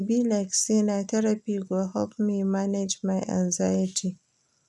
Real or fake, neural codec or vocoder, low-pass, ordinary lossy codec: real; none; none; none